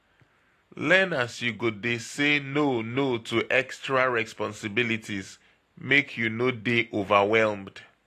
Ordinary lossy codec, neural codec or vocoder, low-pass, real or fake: AAC, 48 kbps; none; 14.4 kHz; real